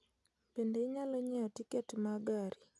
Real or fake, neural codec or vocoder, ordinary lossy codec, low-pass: real; none; none; none